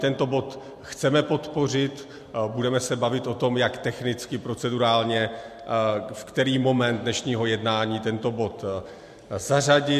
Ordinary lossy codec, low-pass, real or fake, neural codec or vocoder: MP3, 64 kbps; 14.4 kHz; real; none